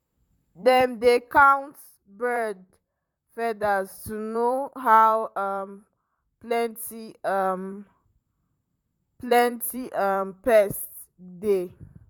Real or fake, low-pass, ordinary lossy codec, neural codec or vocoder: fake; 19.8 kHz; none; vocoder, 44.1 kHz, 128 mel bands, Pupu-Vocoder